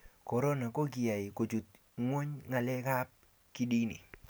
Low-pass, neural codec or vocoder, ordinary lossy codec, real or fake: none; none; none; real